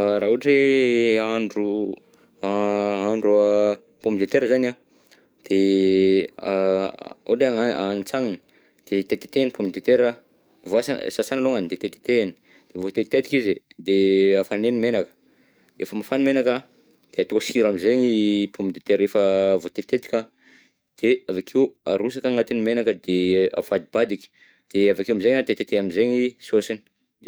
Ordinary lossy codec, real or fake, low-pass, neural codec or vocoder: none; fake; none; codec, 44.1 kHz, 7.8 kbps, DAC